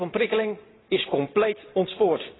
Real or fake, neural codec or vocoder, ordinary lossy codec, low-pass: real; none; AAC, 16 kbps; 7.2 kHz